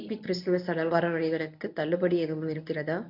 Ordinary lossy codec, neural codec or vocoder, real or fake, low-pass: none; codec, 24 kHz, 0.9 kbps, WavTokenizer, medium speech release version 1; fake; 5.4 kHz